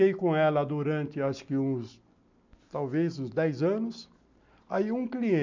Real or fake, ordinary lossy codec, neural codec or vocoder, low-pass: real; MP3, 64 kbps; none; 7.2 kHz